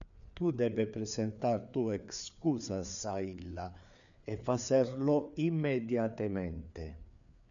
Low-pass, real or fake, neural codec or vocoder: 7.2 kHz; fake; codec, 16 kHz, 4 kbps, FreqCodec, larger model